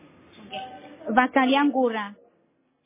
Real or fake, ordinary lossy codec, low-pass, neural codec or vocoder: real; MP3, 16 kbps; 3.6 kHz; none